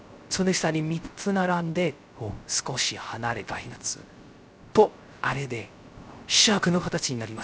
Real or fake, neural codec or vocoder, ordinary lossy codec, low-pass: fake; codec, 16 kHz, 0.3 kbps, FocalCodec; none; none